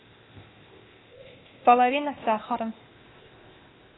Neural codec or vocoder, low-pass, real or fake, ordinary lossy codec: codec, 16 kHz, 0.8 kbps, ZipCodec; 7.2 kHz; fake; AAC, 16 kbps